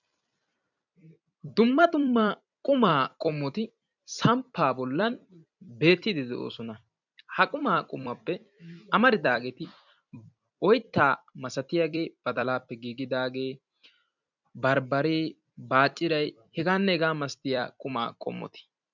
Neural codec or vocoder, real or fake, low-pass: vocoder, 44.1 kHz, 128 mel bands every 256 samples, BigVGAN v2; fake; 7.2 kHz